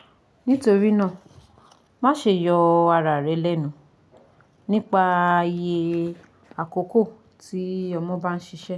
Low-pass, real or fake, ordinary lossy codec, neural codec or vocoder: none; real; none; none